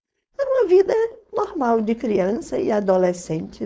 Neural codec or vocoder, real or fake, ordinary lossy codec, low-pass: codec, 16 kHz, 4.8 kbps, FACodec; fake; none; none